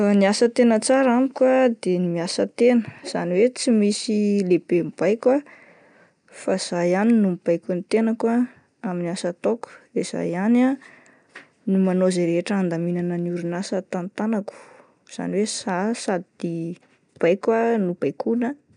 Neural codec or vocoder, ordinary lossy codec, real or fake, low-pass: none; none; real; 9.9 kHz